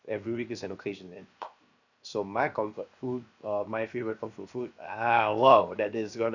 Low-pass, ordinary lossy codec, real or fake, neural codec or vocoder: 7.2 kHz; none; fake; codec, 16 kHz, 0.7 kbps, FocalCodec